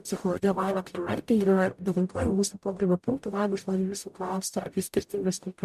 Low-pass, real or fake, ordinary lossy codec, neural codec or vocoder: 14.4 kHz; fake; MP3, 96 kbps; codec, 44.1 kHz, 0.9 kbps, DAC